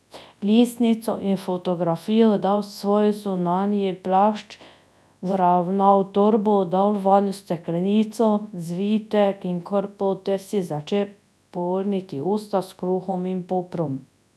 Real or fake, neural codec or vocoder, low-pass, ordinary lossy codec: fake; codec, 24 kHz, 0.9 kbps, WavTokenizer, large speech release; none; none